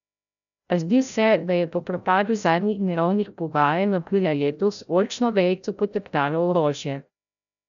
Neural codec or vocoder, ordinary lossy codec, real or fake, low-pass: codec, 16 kHz, 0.5 kbps, FreqCodec, larger model; none; fake; 7.2 kHz